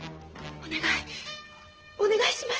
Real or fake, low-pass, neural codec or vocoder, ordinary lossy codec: real; 7.2 kHz; none; Opus, 16 kbps